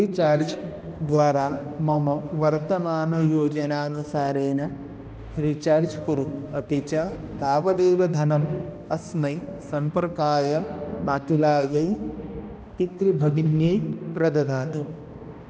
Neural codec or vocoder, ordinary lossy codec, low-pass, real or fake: codec, 16 kHz, 1 kbps, X-Codec, HuBERT features, trained on balanced general audio; none; none; fake